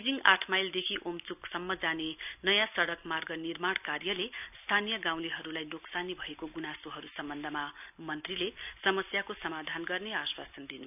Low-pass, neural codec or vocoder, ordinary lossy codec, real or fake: 3.6 kHz; none; none; real